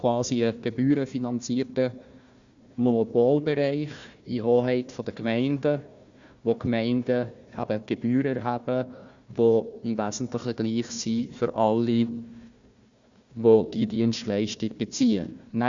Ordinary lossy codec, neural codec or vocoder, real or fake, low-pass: Opus, 64 kbps; codec, 16 kHz, 1 kbps, FunCodec, trained on Chinese and English, 50 frames a second; fake; 7.2 kHz